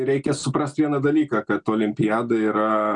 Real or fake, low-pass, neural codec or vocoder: real; 9.9 kHz; none